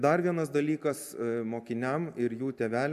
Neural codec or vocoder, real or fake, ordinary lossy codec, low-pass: none; real; MP3, 96 kbps; 14.4 kHz